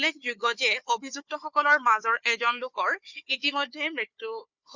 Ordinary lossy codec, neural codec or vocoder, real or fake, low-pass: Opus, 64 kbps; codec, 16 kHz, 4 kbps, FunCodec, trained on Chinese and English, 50 frames a second; fake; 7.2 kHz